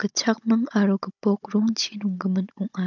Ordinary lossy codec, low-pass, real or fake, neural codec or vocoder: none; 7.2 kHz; fake; codec, 16 kHz, 16 kbps, FunCodec, trained on Chinese and English, 50 frames a second